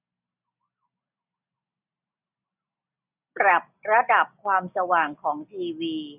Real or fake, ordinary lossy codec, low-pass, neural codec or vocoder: real; none; 3.6 kHz; none